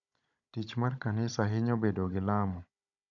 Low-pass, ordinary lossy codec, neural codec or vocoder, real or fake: 7.2 kHz; none; codec, 16 kHz, 16 kbps, FunCodec, trained on Chinese and English, 50 frames a second; fake